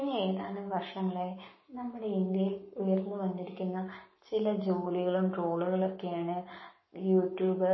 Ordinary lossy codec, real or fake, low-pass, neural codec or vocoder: MP3, 24 kbps; fake; 7.2 kHz; codec, 44.1 kHz, 7.8 kbps, Pupu-Codec